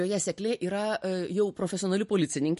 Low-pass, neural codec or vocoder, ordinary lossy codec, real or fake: 14.4 kHz; none; MP3, 48 kbps; real